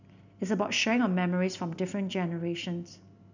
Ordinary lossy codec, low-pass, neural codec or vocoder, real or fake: none; 7.2 kHz; none; real